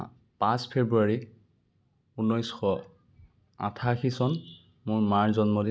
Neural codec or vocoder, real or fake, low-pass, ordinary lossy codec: none; real; none; none